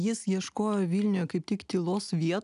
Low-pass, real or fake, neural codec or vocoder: 10.8 kHz; real; none